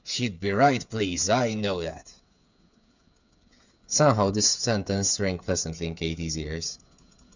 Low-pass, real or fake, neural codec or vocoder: 7.2 kHz; fake; codec, 16 kHz, 8 kbps, FreqCodec, smaller model